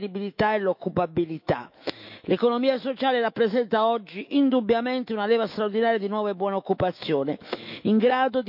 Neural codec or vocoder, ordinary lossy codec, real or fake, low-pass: autoencoder, 48 kHz, 128 numbers a frame, DAC-VAE, trained on Japanese speech; none; fake; 5.4 kHz